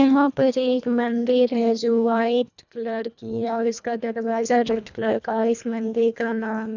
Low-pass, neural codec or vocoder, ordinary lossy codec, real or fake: 7.2 kHz; codec, 24 kHz, 1.5 kbps, HILCodec; none; fake